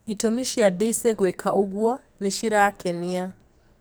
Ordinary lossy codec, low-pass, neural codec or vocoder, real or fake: none; none; codec, 44.1 kHz, 2.6 kbps, SNAC; fake